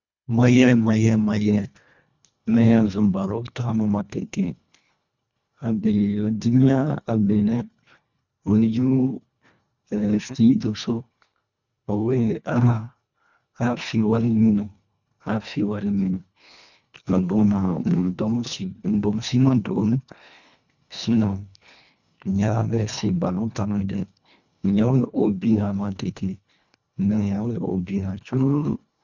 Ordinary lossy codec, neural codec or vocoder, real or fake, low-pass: none; codec, 24 kHz, 1.5 kbps, HILCodec; fake; 7.2 kHz